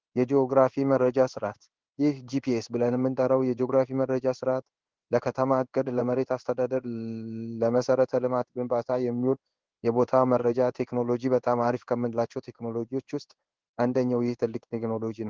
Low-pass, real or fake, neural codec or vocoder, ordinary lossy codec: 7.2 kHz; fake; codec, 16 kHz in and 24 kHz out, 1 kbps, XY-Tokenizer; Opus, 16 kbps